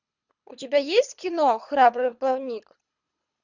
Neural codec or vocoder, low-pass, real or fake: codec, 24 kHz, 3 kbps, HILCodec; 7.2 kHz; fake